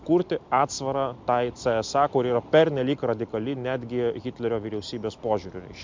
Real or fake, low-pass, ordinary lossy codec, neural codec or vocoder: real; 7.2 kHz; MP3, 64 kbps; none